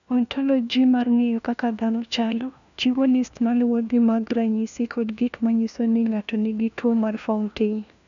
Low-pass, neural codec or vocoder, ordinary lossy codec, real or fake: 7.2 kHz; codec, 16 kHz, 1 kbps, FunCodec, trained on LibriTTS, 50 frames a second; none; fake